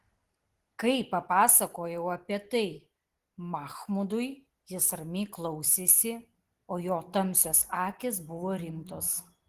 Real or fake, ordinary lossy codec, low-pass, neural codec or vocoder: real; Opus, 16 kbps; 14.4 kHz; none